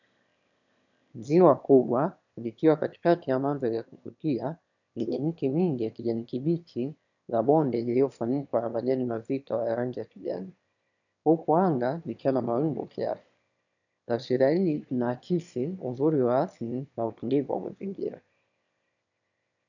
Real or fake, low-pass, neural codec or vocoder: fake; 7.2 kHz; autoencoder, 22.05 kHz, a latent of 192 numbers a frame, VITS, trained on one speaker